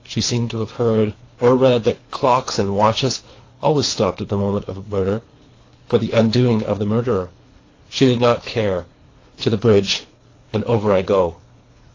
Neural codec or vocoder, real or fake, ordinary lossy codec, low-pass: codec, 24 kHz, 3 kbps, HILCodec; fake; AAC, 32 kbps; 7.2 kHz